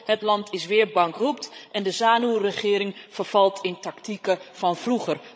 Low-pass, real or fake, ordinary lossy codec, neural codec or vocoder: none; fake; none; codec, 16 kHz, 16 kbps, FreqCodec, larger model